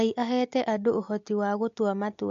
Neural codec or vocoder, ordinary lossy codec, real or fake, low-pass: none; AAC, 48 kbps; real; 7.2 kHz